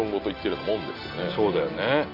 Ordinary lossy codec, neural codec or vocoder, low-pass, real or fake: none; none; 5.4 kHz; real